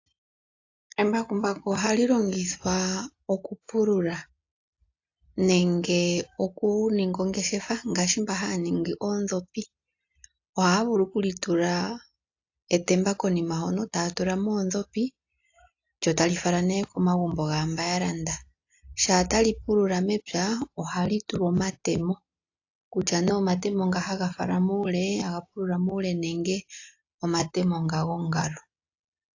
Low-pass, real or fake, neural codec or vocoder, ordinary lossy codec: 7.2 kHz; real; none; AAC, 48 kbps